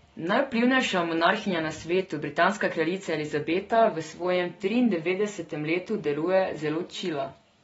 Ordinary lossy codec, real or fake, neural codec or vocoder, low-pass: AAC, 24 kbps; real; none; 19.8 kHz